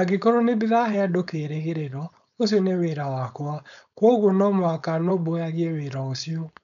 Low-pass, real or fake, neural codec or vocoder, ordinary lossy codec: 7.2 kHz; fake; codec, 16 kHz, 4.8 kbps, FACodec; none